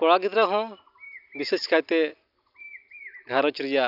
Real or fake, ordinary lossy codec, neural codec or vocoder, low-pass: real; none; none; 5.4 kHz